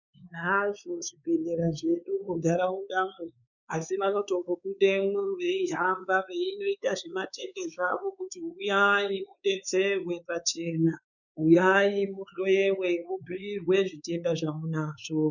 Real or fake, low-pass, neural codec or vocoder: fake; 7.2 kHz; codec, 16 kHz, 4 kbps, X-Codec, WavLM features, trained on Multilingual LibriSpeech